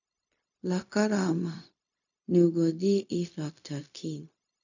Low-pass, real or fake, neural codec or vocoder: 7.2 kHz; fake; codec, 16 kHz, 0.4 kbps, LongCat-Audio-Codec